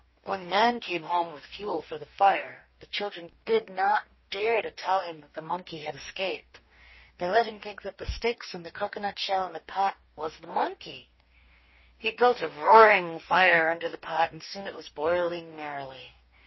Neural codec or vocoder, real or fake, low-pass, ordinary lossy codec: codec, 44.1 kHz, 2.6 kbps, DAC; fake; 7.2 kHz; MP3, 24 kbps